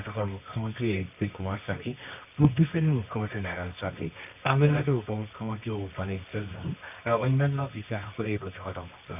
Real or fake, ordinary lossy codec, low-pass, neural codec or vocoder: fake; none; 3.6 kHz; codec, 24 kHz, 0.9 kbps, WavTokenizer, medium music audio release